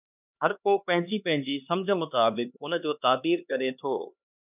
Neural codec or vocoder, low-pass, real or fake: codec, 16 kHz, 2 kbps, X-Codec, HuBERT features, trained on LibriSpeech; 3.6 kHz; fake